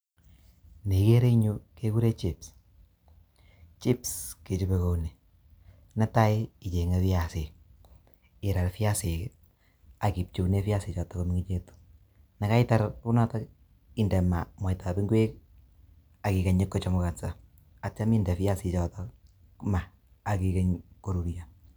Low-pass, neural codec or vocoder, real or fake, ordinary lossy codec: none; none; real; none